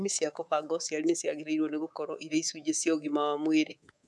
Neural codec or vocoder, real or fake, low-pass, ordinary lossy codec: codec, 24 kHz, 3.1 kbps, DualCodec; fake; none; none